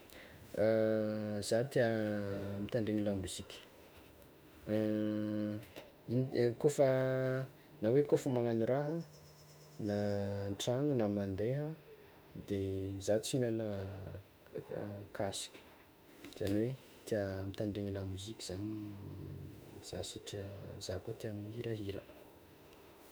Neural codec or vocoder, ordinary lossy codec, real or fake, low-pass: autoencoder, 48 kHz, 32 numbers a frame, DAC-VAE, trained on Japanese speech; none; fake; none